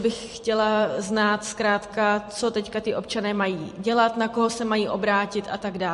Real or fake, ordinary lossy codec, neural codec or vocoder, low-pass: fake; MP3, 48 kbps; vocoder, 44.1 kHz, 128 mel bands every 256 samples, BigVGAN v2; 14.4 kHz